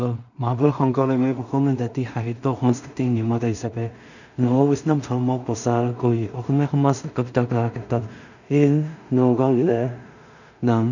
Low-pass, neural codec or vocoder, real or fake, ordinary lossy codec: 7.2 kHz; codec, 16 kHz in and 24 kHz out, 0.4 kbps, LongCat-Audio-Codec, two codebook decoder; fake; none